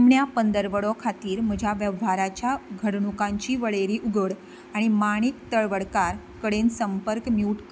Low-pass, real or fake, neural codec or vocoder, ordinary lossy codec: none; real; none; none